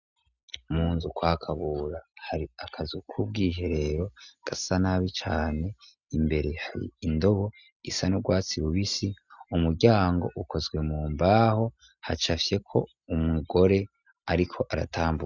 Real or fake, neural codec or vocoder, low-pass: real; none; 7.2 kHz